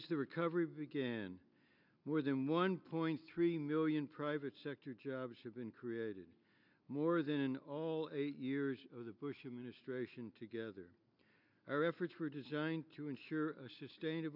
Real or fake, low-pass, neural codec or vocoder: real; 5.4 kHz; none